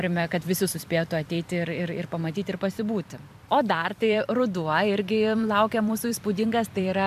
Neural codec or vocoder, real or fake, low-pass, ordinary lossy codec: vocoder, 44.1 kHz, 128 mel bands every 256 samples, BigVGAN v2; fake; 14.4 kHz; MP3, 96 kbps